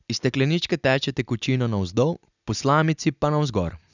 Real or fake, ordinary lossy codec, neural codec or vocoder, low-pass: real; none; none; 7.2 kHz